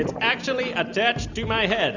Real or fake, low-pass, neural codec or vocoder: real; 7.2 kHz; none